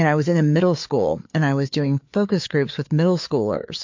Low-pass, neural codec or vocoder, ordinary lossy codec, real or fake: 7.2 kHz; codec, 16 kHz, 4 kbps, FreqCodec, larger model; MP3, 48 kbps; fake